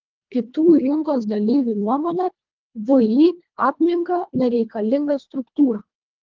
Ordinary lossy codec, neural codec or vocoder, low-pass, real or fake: Opus, 32 kbps; codec, 24 kHz, 1.5 kbps, HILCodec; 7.2 kHz; fake